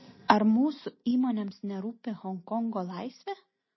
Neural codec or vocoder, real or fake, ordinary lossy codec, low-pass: none; real; MP3, 24 kbps; 7.2 kHz